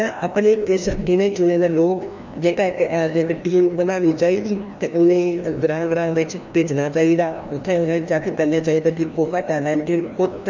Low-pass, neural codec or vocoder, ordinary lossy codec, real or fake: 7.2 kHz; codec, 16 kHz, 1 kbps, FreqCodec, larger model; none; fake